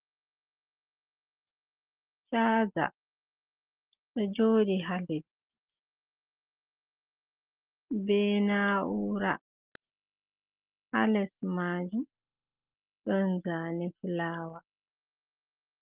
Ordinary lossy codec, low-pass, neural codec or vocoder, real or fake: Opus, 16 kbps; 3.6 kHz; none; real